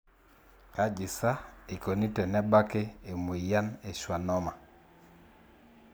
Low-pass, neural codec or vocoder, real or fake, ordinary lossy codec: none; none; real; none